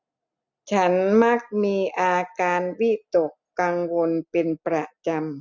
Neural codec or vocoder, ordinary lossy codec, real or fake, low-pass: none; none; real; 7.2 kHz